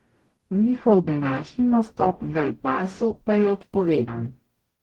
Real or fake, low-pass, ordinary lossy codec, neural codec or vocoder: fake; 19.8 kHz; Opus, 16 kbps; codec, 44.1 kHz, 0.9 kbps, DAC